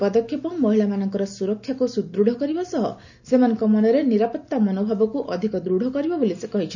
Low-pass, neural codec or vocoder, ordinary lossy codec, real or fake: 7.2 kHz; none; none; real